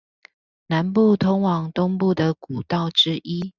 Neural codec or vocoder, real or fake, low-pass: none; real; 7.2 kHz